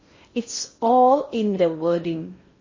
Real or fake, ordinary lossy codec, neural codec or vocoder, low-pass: fake; MP3, 32 kbps; codec, 16 kHz in and 24 kHz out, 0.6 kbps, FocalCodec, streaming, 2048 codes; 7.2 kHz